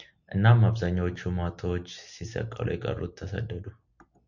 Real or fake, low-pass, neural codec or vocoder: real; 7.2 kHz; none